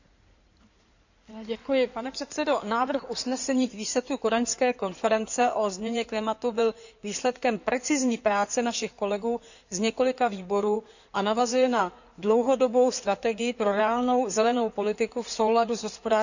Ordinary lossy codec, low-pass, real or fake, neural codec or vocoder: none; 7.2 kHz; fake; codec, 16 kHz in and 24 kHz out, 2.2 kbps, FireRedTTS-2 codec